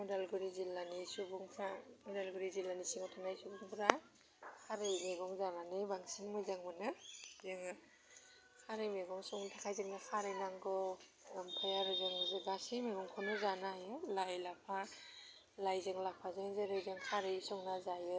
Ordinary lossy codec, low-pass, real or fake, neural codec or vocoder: none; none; real; none